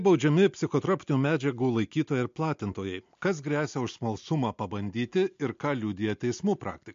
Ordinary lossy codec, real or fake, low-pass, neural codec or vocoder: MP3, 48 kbps; real; 7.2 kHz; none